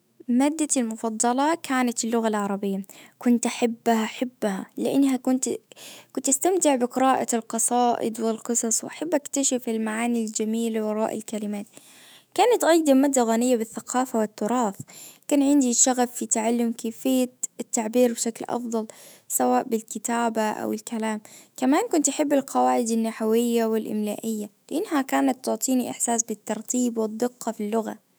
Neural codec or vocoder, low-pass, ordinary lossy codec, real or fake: autoencoder, 48 kHz, 128 numbers a frame, DAC-VAE, trained on Japanese speech; none; none; fake